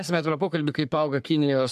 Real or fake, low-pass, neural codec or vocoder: fake; 14.4 kHz; codec, 44.1 kHz, 3.4 kbps, Pupu-Codec